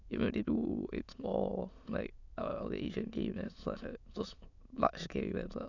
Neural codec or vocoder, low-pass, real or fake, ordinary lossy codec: autoencoder, 22.05 kHz, a latent of 192 numbers a frame, VITS, trained on many speakers; 7.2 kHz; fake; none